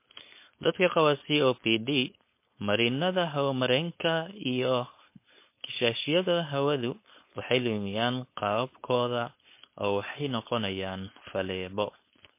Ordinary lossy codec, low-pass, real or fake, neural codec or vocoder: MP3, 24 kbps; 3.6 kHz; fake; codec, 16 kHz, 4.8 kbps, FACodec